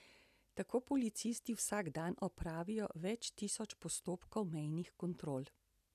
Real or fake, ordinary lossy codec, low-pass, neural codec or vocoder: real; none; 14.4 kHz; none